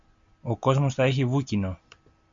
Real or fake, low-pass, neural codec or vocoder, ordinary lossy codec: real; 7.2 kHz; none; MP3, 48 kbps